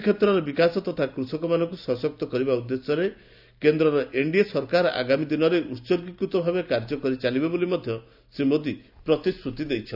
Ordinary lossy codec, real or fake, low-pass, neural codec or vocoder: none; real; 5.4 kHz; none